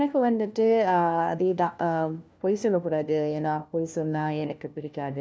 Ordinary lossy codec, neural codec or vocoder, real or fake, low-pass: none; codec, 16 kHz, 1 kbps, FunCodec, trained on LibriTTS, 50 frames a second; fake; none